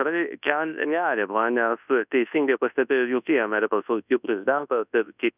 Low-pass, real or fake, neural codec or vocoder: 3.6 kHz; fake; codec, 24 kHz, 0.9 kbps, WavTokenizer, large speech release